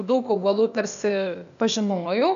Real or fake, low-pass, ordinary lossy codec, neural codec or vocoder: fake; 7.2 kHz; MP3, 96 kbps; codec, 16 kHz, 0.8 kbps, ZipCodec